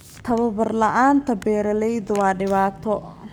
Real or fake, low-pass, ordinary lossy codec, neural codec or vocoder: fake; none; none; codec, 44.1 kHz, 7.8 kbps, Pupu-Codec